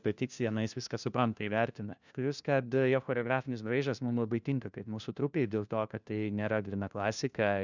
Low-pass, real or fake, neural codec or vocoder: 7.2 kHz; fake; codec, 16 kHz, 1 kbps, FunCodec, trained on LibriTTS, 50 frames a second